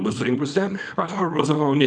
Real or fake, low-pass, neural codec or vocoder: fake; 9.9 kHz; codec, 24 kHz, 0.9 kbps, WavTokenizer, small release